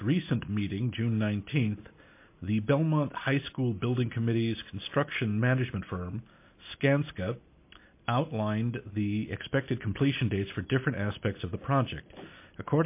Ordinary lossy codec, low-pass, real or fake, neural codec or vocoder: MP3, 24 kbps; 3.6 kHz; real; none